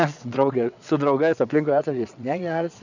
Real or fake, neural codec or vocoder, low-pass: fake; vocoder, 22.05 kHz, 80 mel bands, WaveNeXt; 7.2 kHz